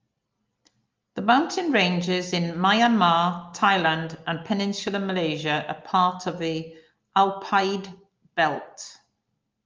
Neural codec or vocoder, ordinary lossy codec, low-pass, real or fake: none; Opus, 24 kbps; 7.2 kHz; real